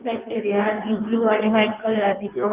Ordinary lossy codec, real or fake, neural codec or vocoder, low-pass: Opus, 24 kbps; fake; codec, 16 kHz in and 24 kHz out, 1.1 kbps, FireRedTTS-2 codec; 3.6 kHz